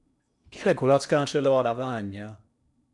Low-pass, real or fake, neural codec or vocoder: 10.8 kHz; fake; codec, 16 kHz in and 24 kHz out, 0.8 kbps, FocalCodec, streaming, 65536 codes